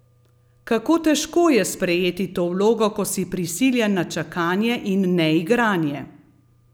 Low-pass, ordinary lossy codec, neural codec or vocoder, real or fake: none; none; none; real